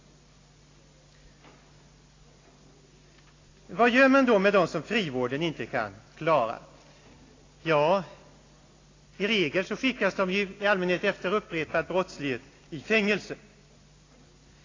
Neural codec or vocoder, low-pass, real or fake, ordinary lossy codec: none; 7.2 kHz; real; AAC, 32 kbps